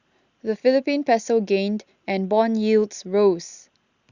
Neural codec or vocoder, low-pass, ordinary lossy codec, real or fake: none; 7.2 kHz; Opus, 64 kbps; real